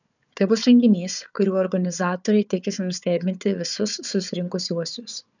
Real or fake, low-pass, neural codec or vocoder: fake; 7.2 kHz; codec, 16 kHz, 4 kbps, FunCodec, trained on Chinese and English, 50 frames a second